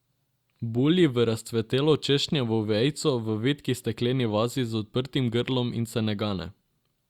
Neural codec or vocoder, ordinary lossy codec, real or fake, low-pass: none; Opus, 64 kbps; real; 19.8 kHz